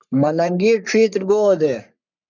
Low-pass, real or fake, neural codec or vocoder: 7.2 kHz; fake; codec, 44.1 kHz, 3.4 kbps, Pupu-Codec